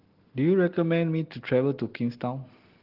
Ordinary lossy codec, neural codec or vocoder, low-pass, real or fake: Opus, 16 kbps; none; 5.4 kHz; real